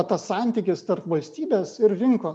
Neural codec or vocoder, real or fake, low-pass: none; real; 9.9 kHz